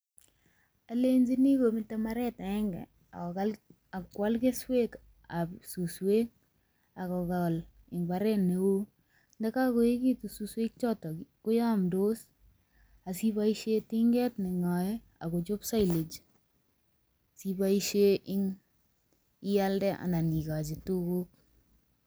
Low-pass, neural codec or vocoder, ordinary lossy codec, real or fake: none; none; none; real